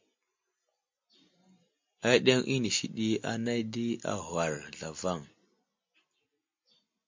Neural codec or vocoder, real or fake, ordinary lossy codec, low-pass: none; real; MP3, 48 kbps; 7.2 kHz